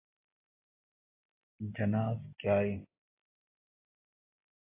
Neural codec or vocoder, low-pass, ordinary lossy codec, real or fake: none; 3.6 kHz; AAC, 32 kbps; real